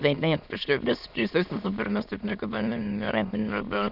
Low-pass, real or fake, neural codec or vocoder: 5.4 kHz; fake; autoencoder, 22.05 kHz, a latent of 192 numbers a frame, VITS, trained on many speakers